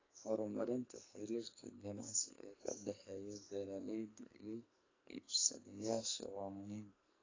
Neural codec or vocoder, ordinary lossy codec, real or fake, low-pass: codec, 44.1 kHz, 2.6 kbps, SNAC; AAC, 32 kbps; fake; 7.2 kHz